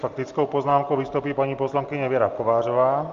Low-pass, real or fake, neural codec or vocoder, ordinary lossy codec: 7.2 kHz; real; none; Opus, 32 kbps